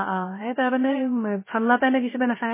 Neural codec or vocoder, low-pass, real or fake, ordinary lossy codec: codec, 16 kHz, 0.3 kbps, FocalCodec; 3.6 kHz; fake; MP3, 16 kbps